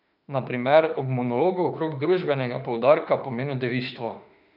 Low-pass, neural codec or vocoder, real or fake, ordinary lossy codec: 5.4 kHz; autoencoder, 48 kHz, 32 numbers a frame, DAC-VAE, trained on Japanese speech; fake; none